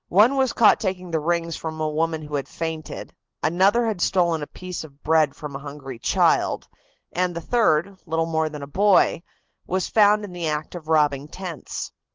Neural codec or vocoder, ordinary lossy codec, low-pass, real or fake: none; Opus, 16 kbps; 7.2 kHz; real